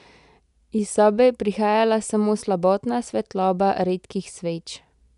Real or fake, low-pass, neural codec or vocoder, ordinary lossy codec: real; 10.8 kHz; none; none